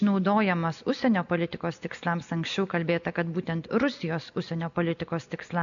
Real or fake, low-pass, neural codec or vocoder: real; 7.2 kHz; none